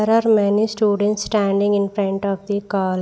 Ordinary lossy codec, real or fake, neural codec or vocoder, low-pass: none; real; none; none